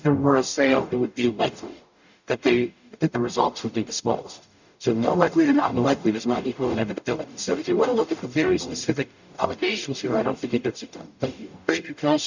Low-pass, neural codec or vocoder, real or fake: 7.2 kHz; codec, 44.1 kHz, 0.9 kbps, DAC; fake